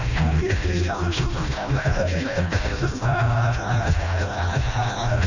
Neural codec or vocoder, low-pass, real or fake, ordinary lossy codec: codec, 16 kHz, 1 kbps, FreqCodec, smaller model; 7.2 kHz; fake; none